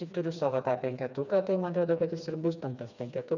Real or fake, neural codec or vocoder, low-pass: fake; codec, 16 kHz, 2 kbps, FreqCodec, smaller model; 7.2 kHz